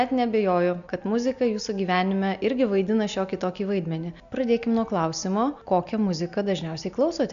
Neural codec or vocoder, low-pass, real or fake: none; 7.2 kHz; real